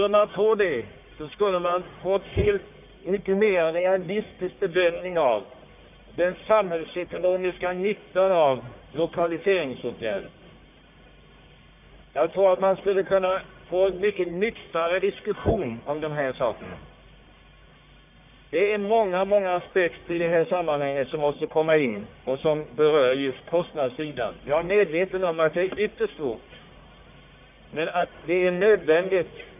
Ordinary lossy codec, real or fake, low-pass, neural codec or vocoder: none; fake; 3.6 kHz; codec, 44.1 kHz, 1.7 kbps, Pupu-Codec